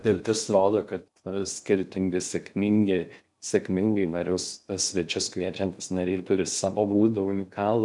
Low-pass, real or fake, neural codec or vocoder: 10.8 kHz; fake; codec, 16 kHz in and 24 kHz out, 0.6 kbps, FocalCodec, streaming, 2048 codes